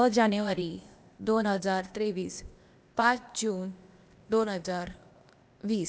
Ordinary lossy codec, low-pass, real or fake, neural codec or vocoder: none; none; fake; codec, 16 kHz, 0.8 kbps, ZipCodec